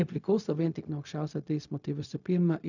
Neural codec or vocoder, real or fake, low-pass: codec, 16 kHz, 0.4 kbps, LongCat-Audio-Codec; fake; 7.2 kHz